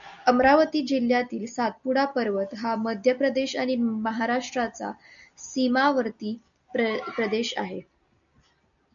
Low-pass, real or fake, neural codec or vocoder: 7.2 kHz; real; none